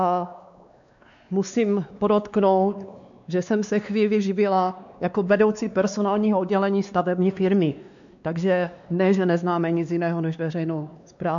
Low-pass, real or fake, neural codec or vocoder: 7.2 kHz; fake; codec, 16 kHz, 2 kbps, X-Codec, WavLM features, trained on Multilingual LibriSpeech